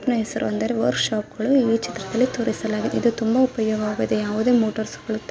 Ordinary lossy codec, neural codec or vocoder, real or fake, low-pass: none; none; real; none